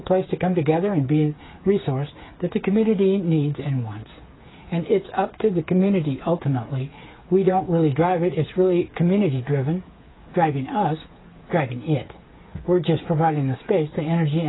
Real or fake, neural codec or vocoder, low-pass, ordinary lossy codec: fake; codec, 16 kHz, 8 kbps, FreqCodec, smaller model; 7.2 kHz; AAC, 16 kbps